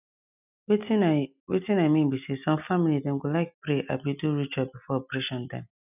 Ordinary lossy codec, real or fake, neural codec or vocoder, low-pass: none; real; none; 3.6 kHz